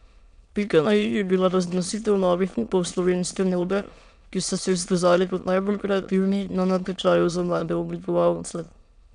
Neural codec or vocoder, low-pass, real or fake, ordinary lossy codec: autoencoder, 22.05 kHz, a latent of 192 numbers a frame, VITS, trained on many speakers; 9.9 kHz; fake; none